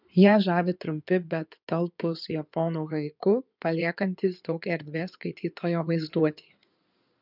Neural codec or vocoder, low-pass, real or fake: codec, 16 kHz in and 24 kHz out, 2.2 kbps, FireRedTTS-2 codec; 5.4 kHz; fake